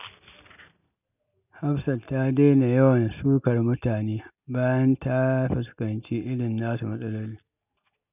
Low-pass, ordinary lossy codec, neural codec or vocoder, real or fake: 3.6 kHz; none; none; real